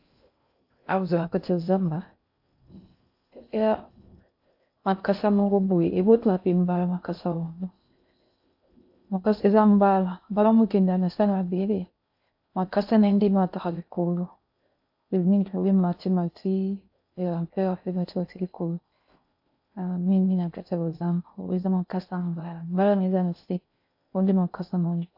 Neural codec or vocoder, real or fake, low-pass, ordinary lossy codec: codec, 16 kHz in and 24 kHz out, 0.6 kbps, FocalCodec, streaming, 2048 codes; fake; 5.4 kHz; AAC, 48 kbps